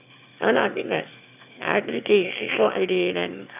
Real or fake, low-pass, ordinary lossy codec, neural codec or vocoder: fake; 3.6 kHz; none; autoencoder, 22.05 kHz, a latent of 192 numbers a frame, VITS, trained on one speaker